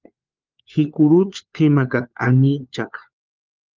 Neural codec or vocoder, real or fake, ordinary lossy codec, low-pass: codec, 16 kHz, 4 kbps, FunCodec, trained on LibriTTS, 50 frames a second; fake; Opus, 24 kbps; 7.2 kHz